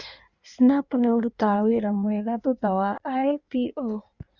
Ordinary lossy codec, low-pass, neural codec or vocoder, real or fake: Opus, 64 kbps; 7.2 kHz; codec, 16 kHz in and 24 kHz out, 1.1 kbps, FireRedTTS-2 codec; fake